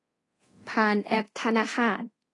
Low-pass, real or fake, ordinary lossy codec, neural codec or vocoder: 10.8 kHz; fake; AAC, 32 kbps; codec, 24 kHz, 0.9 kbps, DualCodec